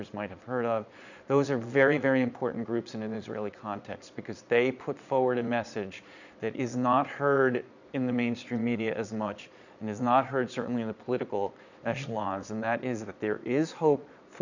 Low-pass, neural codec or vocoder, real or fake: 7.2 kHz; vocoder, 22.05 kHz, 80 mel bands, Vocos; fake